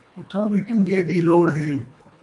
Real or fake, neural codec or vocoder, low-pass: fake; codec, 24 kHz, 1.5 kbps, HILCodec; 10.8 kHz